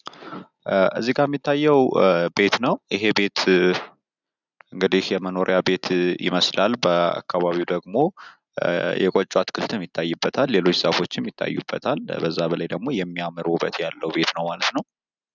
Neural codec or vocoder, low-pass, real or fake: none; 7.2 kHz; real